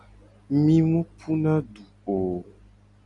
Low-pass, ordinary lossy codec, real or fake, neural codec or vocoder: 10.8 kHz; Opus, 64 kbps; real; none